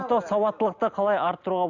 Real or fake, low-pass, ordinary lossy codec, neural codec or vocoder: real; 7.2 kHz; none; none